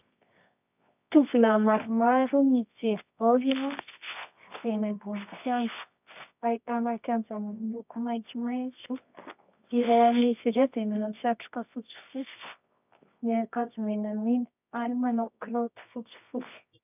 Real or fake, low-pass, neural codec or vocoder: fake; 3.6 kHz; codec, 24 kHz, 0.9 kbps, WavTokenizer, medium music audio release